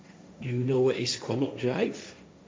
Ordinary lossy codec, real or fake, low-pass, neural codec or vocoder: none; fake; none; codec, 16 kHz, 1.1 kbps, Voila-Tokenizer